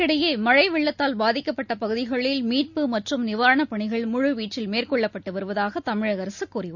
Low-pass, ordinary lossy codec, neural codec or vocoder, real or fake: 7.2 kHz; none; none; real